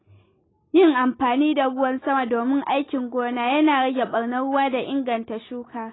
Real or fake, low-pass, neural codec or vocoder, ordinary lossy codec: real; 7.2 kHz; none; AAC, 16 kbps